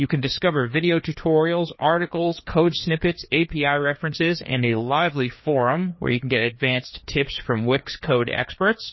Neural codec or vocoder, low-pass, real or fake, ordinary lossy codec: codec, 16 kHz, 2 kbps, FreqCodec, larger model; 7.2 kHz; fake; MP3, 24 kbps